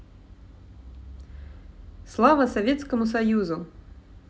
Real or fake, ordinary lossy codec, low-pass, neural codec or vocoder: real; none; none; none